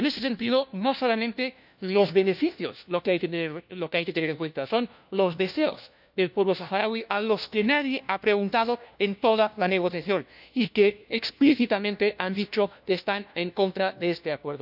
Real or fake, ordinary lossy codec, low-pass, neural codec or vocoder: fake; none; 5.4 kHz; codec, 16 kHz, 1 kbps, FunCodec, trained on LibriTTS, 50 frames a second